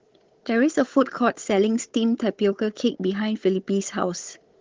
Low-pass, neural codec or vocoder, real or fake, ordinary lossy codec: 7.2 kHz; codec, 16 kHz, 16 kbps, FunCodec, trained on Chinese and English, 50 frames a second; fake; Opus, 16 kbps